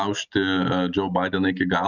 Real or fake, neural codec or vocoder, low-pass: real; none; 7.2 kHz